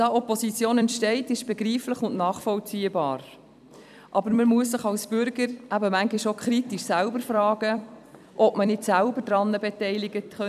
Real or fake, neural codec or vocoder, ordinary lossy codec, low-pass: fake; vocoder, 44.1 kHz, 128 mel bands every 256 samples, BigVGAN v2; none; 14.4 kHz